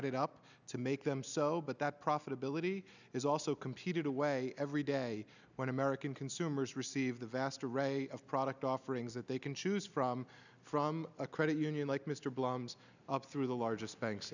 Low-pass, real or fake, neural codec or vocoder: 7.2 kHz; real; none